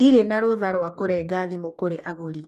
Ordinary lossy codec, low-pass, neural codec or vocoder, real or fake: none; 14.4 kHz; codec, 44.1 kHz, 2.6 kbps, DAC; fake